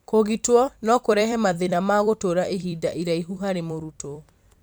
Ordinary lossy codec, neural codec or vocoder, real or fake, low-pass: none; vocoder, 44.1 kHz, 128 mel bands every 256 samples, BigVGAN v2; fake; none